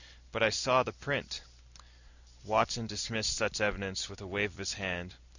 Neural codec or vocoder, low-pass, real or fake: none; 7.2 kHz; real